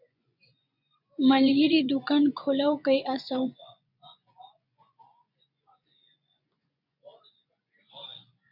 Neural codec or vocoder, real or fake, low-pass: vocoder, 24 kHz, 100 mel bands, Vocos; fake; 5.4 kHz